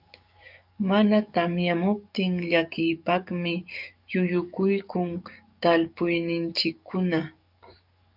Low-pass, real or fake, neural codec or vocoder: 5.4 kHz; fake; codec, 44.1 kHz, 7.8 kbps, DAC